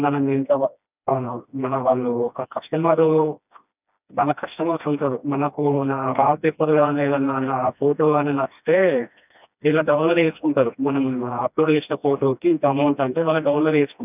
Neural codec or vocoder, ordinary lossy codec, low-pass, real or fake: codec, 16 kHz, 1 kbps, FreqCodec, smaller model; none; 3.6 kHz; fake